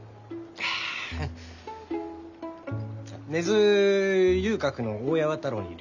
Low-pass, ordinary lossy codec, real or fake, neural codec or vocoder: 7.2 kHz; none; real; none